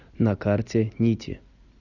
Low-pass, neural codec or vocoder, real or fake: 7.2 kHz; none; real